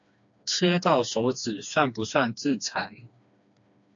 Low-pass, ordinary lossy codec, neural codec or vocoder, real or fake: 7.2 kHz; AAC, 64 kbps; codec, 16 kHz, 2 kbps, FreqCodec, smaller model; fake